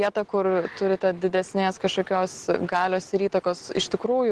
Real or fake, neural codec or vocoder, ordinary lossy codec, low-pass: real; none; Opus, 16 kbps; 10.8 kHz